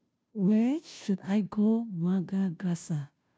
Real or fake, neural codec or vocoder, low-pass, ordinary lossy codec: fake; codec, 16 kHz, 0.5 kbps, FunCodec, trained on Chinese and English, 25 frames a second; none; none